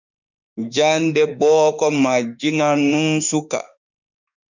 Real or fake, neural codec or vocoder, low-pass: fake; autoencoder, 48 kHz, 32 numbers a frame, DAC-VAE, trained on Japanese speech; 7.2 kHz